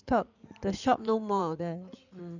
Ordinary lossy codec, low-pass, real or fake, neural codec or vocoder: none; 7.2 kHz; fake; codec, 16 kHz in and 24 kHz out, 2.2 kbps, FireRedTTS-2 codec